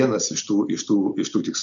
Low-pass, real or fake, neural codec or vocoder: 7.2 kHz; real; none